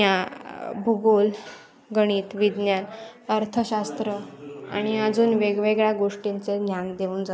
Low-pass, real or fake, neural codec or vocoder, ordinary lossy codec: none; real; none; none